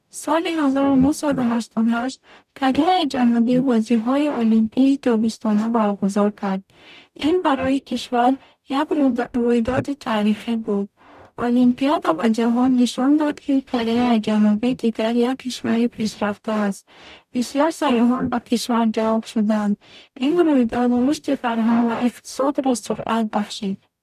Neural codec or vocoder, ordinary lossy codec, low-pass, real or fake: codec, 44.1 kHz, 0.9 kbps, DAC; none; 14.4 kHz; fake